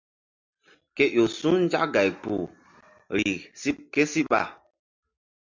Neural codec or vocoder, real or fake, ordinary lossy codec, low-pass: none; real; MP3, 64 kbps; 7.2 kHz